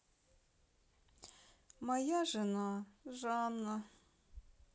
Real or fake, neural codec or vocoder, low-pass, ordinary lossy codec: real; none; none; none